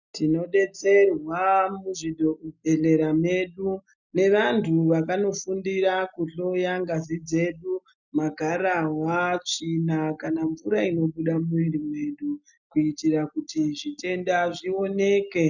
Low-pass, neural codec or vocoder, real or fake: 7.2 kHz; none; real